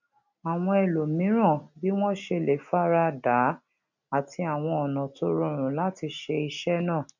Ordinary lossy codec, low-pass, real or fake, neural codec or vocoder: none; 7.2 kHz; real; none